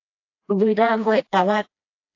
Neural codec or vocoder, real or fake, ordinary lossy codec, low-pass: codec, 16 kHz, 1 kbps, FreqCodec, smaller model; fake; AAC, 48 kbps; 7.2 kHz